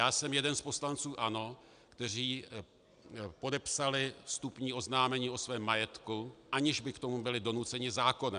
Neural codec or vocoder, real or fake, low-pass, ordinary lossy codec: none; real; 9.9 kHz; AAC, 96 kbps